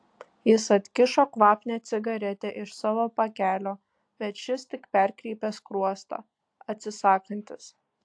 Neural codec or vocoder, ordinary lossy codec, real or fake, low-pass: none; AAC, 64 kbps; real; 9.9 kHz